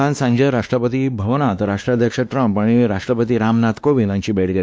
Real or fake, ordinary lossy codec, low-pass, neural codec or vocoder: fake; none; none; codec, 16 kHz, 2 kbps, X-Codec, WavLM features, trained on Multilingual LibriSpeech